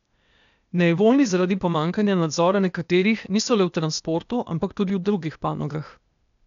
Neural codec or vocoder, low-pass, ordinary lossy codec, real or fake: codec, 16 kHz, 0.8 kbps, ZipCodec; 7.2 kHz; none; fake